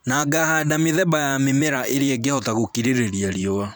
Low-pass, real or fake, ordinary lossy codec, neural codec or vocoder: none; fake; none; vocoder, 44.1 kHz, 128 mel bands every 512 samples, BigVGAN v2